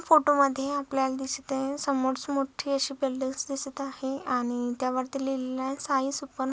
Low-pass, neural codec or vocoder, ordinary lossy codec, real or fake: none; none; none; real